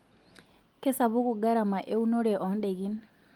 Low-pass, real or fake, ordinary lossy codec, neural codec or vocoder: 19.8 kHz; real; Opus, 32 kbps; none